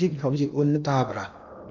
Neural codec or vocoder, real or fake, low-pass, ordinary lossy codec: codec, 16 kHz in and 24 kHz out, 0.8 kbps, FocalCodec, streaming, 65536 codes; fake; 7.2 kHz; none